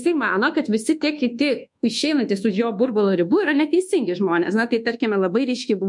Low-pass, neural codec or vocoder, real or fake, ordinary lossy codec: 10.8 kHz; codec, 24 kHz, 1.2 kbps, DualCodec; fake; MP3, 64 kbps